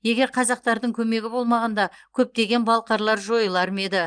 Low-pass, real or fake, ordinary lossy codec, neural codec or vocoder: 9.9 kHz; real; Opus, 24 kbps; none